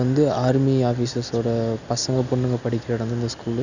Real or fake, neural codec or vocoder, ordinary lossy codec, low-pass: real; none; none; 7.2 kHz